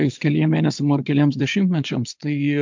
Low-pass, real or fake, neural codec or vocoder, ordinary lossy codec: 7.2 kHz; fake; vocoder, 22.05 kHz, 80 mel bands, Vocos; MP3, 64 kbps